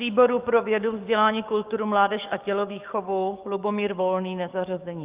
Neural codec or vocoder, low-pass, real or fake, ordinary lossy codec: codec, 44.1 kHz, 7.8 kbps, DAC; 3.6 kHz; fake; Opus, 32 kbps